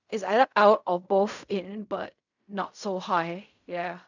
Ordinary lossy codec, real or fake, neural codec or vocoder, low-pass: none; fake; codec, 16 kHz in and 24 kHz out, 0.4 kbps, LongCat-Audio-Codec, fine tuned four codebook decoder; 7.2 kHz